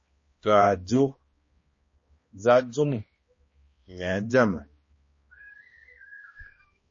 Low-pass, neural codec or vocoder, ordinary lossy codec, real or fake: 7.2 kHz; codec, 16 kHz, 1 kbps, X-Codec, HuBERT features, trained on balanced general audio; MP3, 32 kbps; fake